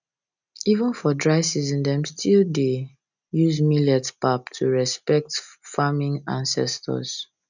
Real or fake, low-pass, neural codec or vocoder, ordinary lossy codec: real; 7.2 kHz; none; none